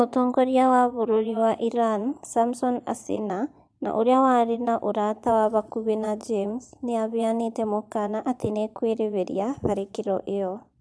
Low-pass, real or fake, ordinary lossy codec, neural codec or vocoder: none; fake; none; vocoder, 22.05 kHz, 80 mel bands, Vocos